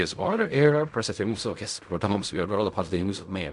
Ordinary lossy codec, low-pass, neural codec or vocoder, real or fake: MP3, 96 kbps; 10.8 kHz; codec, 16 kHz in and 24 kHz out, 0.4 kbps, LongCat-Audio-Codec, fine tuned four codebook decoder; fake